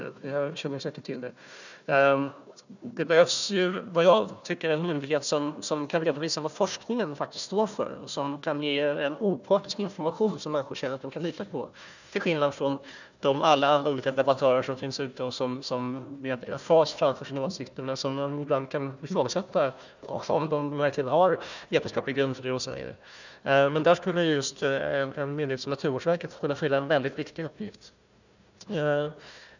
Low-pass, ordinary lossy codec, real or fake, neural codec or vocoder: 7.2 kHz; none; fake; codec, 16 kHz, 1 kbps, FunCodec, trained on Chinese and English, 50 frames a second